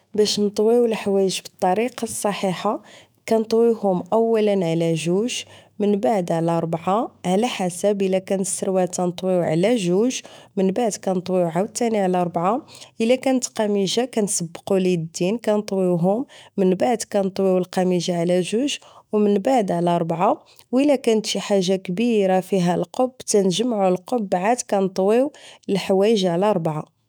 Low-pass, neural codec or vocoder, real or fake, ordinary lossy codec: none; autoencoder, 48 kHz, 128 numbers a frame, DAC-VAE, trained on Japanese speech; fake; none